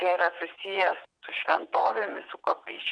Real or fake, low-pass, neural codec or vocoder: fake; 9.9 kHz; vocoder, 22.05 kHz, 80 mel bands, WaveNeXt